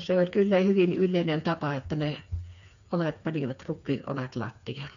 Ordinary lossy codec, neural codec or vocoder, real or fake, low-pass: none; codec, 16 kHz, 4 kbps, FreqCodec, smaller model; fake; 7.2 kHz